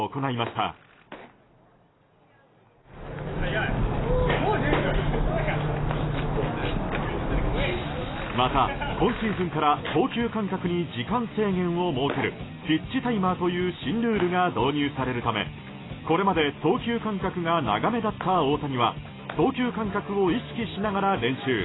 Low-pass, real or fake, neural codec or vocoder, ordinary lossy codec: 7.2 kHz; real; none; AAC, 16 kbps